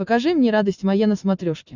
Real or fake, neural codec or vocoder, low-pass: real; none; 7.2 kHz